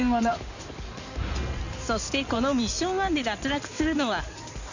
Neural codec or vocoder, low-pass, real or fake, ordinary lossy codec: codec, 16 kHz in and 24 kHz out, 2.2 kbps, FireRedTTS-2 codec; 7.2 kHz; fake; none